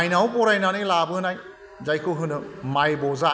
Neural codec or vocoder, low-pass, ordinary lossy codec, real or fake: none; none; none; real